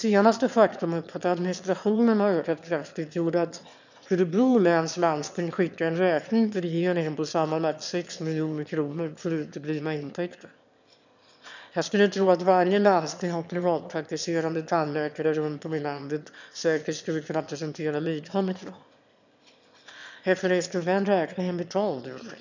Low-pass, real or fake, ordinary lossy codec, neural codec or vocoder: 7.2 kHz; fake; none; autoencoder, 22.05 kHz, a latent of 192 numbers a frame, VITS, trained on one speaker